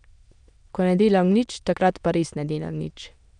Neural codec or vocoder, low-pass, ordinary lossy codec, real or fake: autoencoder, 22.05 kHz, a latent of 192 numbers a frame, VITS, trained on many speakers; 9.9 kHz; none; fake